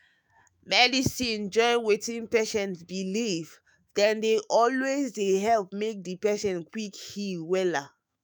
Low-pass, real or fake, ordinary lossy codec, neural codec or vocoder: none; fake; none; autoencoder, 48 kHz, 128 numbers a frame, DAC-VAE, trained on Japanese speech